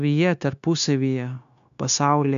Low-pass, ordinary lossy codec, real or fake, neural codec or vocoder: 7.2 kHz; MP3, 96 kbps; fake; codec, 16 kHz, 0.9 kbps, LongCat-Audio-Codec